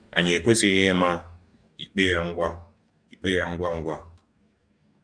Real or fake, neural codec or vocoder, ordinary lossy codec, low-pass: fake; codec, 44.1 kHz, 2.6 kbps, DAC; none; 9.9 kHz